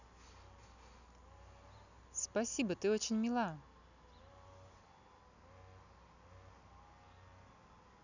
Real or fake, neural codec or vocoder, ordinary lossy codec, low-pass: real; none; none; 7.2 kHz